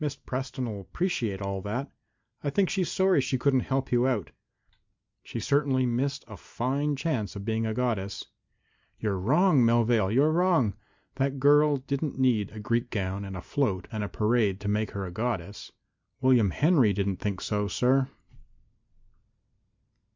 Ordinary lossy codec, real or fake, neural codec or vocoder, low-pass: MP3, 64 kbps; real; none; 7.2 kHz